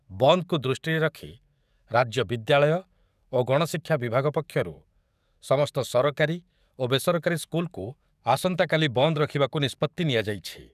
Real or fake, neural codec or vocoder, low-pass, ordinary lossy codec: fake; codec, 44.1 kHz, 7.8 kbps, DAC; 14.4 kHz; none